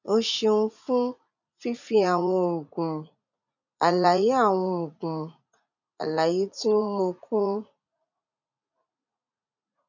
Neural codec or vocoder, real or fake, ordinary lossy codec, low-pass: vocoder, 44.1 kHz, 80 mel bands, Vocos; fake; none; 7.2 kHz